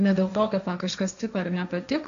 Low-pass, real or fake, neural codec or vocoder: 7.2 kHz; fake; codec, 16 kHz, 1.1 kbps, Voila-Tokenizer